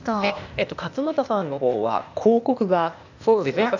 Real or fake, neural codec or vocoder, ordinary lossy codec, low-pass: fake; codec, 16 kHz, 0.8 kbps, ZipCodec; none; 7.2 kHz